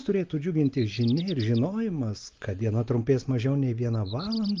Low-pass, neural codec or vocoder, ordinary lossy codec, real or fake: 7.2 kHz; none; Opus, 32 kbps; real